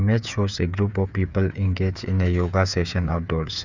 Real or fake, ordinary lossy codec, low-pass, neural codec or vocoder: fake; Opus, 64 kbps; 7.2 kHz; codec, 16 kHz, 8 kbps, FreqCodec, smaller model